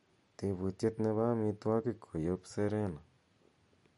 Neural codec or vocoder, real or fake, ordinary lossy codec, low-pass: none; real; MP3, 48 kbps; 19.8 kHz